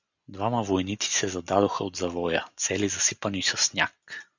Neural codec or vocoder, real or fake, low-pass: none; real; 7.2 kHz